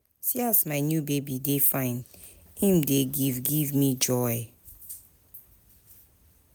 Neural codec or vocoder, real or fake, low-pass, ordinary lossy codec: none; real; none; none